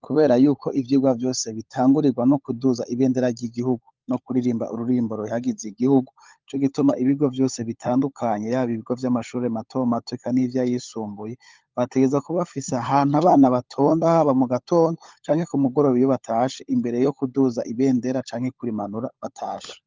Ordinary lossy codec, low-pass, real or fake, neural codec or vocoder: Opus, 24 kbps; 7.2 kHz; fake; codec, 16 kHz, 16 kbps, FunCodec, trained on LibriTTS, 50 frames a second